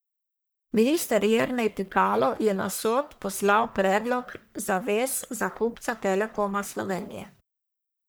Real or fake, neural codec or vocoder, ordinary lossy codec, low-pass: fake; codec, 44.1 kHz, 1.7 kbps, Pupu-Codec; none; none